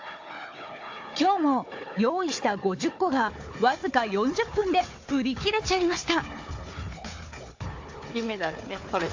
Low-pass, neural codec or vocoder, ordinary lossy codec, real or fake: 7.2 kHz; codec, 16 kHz, 4 kbps, FunCodec, trained on Chinese and English, 50 frames a second; AAC, 48 kbps; fake